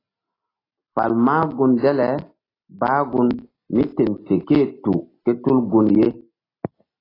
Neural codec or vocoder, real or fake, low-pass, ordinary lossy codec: none; real; 5.4 kHz; AAC, 24 kbps